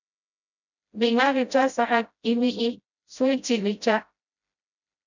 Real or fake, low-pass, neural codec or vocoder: fake; 7.2 kHz; codec, 16 kHz, 0.5 kbps, FreqCodec, smaller model